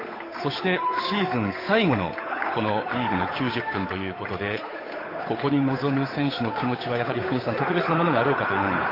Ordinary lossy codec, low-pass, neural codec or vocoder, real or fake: AAC, 24 kbps; 5.4 kHz; codec, 16 kHz, 8 kbps, FunCodec, trained on Chinese and English, 25 frames a second; fake